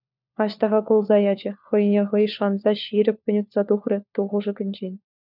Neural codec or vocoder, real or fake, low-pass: codec, 16 kHz, 4 kbps, FunCodec, trained on LibriTTS, 50 frames a second; fake; 5.4 kHz